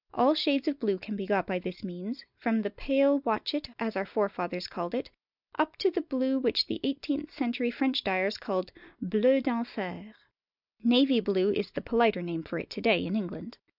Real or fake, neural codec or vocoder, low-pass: real; none; 5.4 kHz